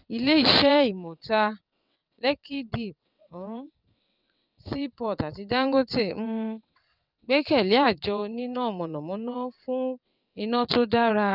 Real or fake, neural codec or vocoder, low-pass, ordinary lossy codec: fake; vocoder, 22.05 kHz, 80 mel bands, WaveNeXt; 5.4 kHz; none